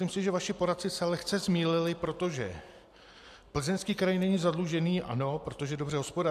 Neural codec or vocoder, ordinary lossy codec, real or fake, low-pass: none; AAC, 96 kbps; real; 14.4 kHz